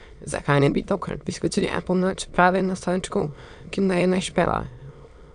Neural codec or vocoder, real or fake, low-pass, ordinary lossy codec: autoencoder, 22.05 kHz, a latent of 192 numbers a frame, VITS, trained on many speakers; fake; 9.9 kHz; none